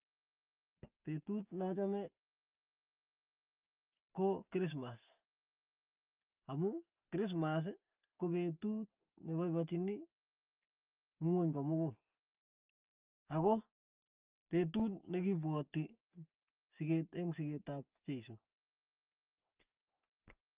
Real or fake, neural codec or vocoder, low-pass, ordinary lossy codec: real; none; 3.6 kHz; Opus, 24 kbps